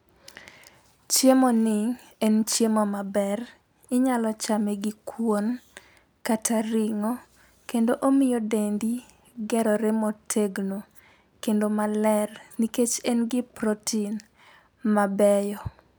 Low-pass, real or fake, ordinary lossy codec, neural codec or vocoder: none; real; none; none